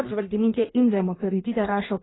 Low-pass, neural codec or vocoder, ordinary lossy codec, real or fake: 7.2 kHz; codec, 16 kHz in and 24 kHz out, 1.1 kbps, FireRedTTS-2 codec; AAC, 16 kbps; fake